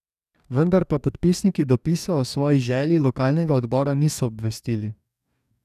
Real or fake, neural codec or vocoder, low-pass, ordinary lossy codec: fake; codec, 44.1 kHz, 2.6 kbps, DAC; 14.4 kHz; none